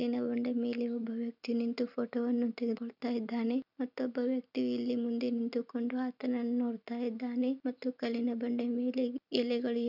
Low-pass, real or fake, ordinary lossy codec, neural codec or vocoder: 5.4 kHz; real; none; none